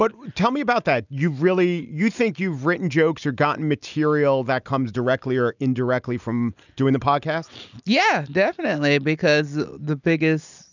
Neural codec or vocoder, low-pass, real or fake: none; 7.2 kHz; real